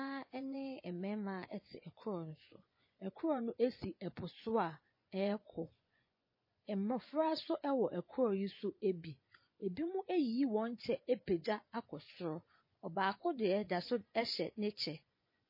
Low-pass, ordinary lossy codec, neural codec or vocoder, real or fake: 5.4 kHz; MP3, 24 kbps; vocoder, 24 kHz, 100 mel bands, Vocos; fake